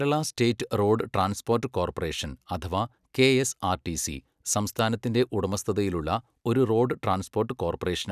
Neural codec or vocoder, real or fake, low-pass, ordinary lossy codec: none; real; 14.4 kHz; none